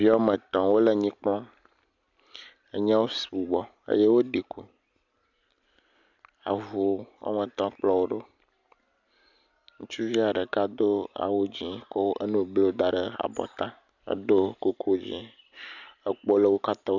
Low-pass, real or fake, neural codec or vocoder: 7.2 kHz; real; none